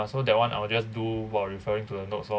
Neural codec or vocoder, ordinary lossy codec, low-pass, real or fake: none; none; none; real